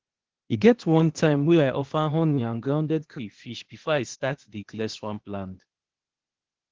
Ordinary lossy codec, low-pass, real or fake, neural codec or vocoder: Opus, 16 kbps; 7.2 kHz; fake; codec, 16 kHz, 0.8 kbps, ZipCodec